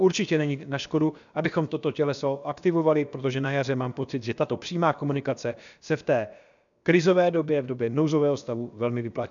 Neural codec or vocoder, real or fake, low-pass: codec, 16 kHz, about 1 kbps, DyCAST, with the encoder's durations; fake; 7.2 kHz